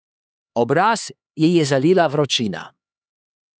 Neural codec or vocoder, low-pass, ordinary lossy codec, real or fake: codec, 16 kHz, 4 kbps, X-Codec, HuBERT features, trained on LibriSpeech; none; none; fake